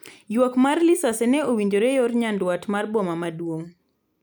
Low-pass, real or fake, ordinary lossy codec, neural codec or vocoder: none; real; none; none